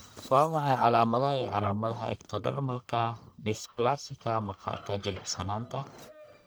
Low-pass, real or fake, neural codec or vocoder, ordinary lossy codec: none; fake; codec, 44.1 kHz, 1.7 kbps, Pupu-Codec; none